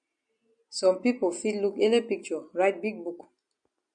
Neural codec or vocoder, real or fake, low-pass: none; real; 9.9 kHz